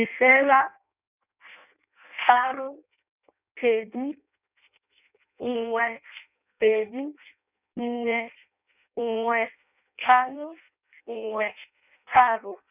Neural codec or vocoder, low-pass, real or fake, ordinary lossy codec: codec, 16 kHz in and 24 kHz out, 0.6 kbps, FireRedTTS-2 codec; 3.6 kHz; fake; none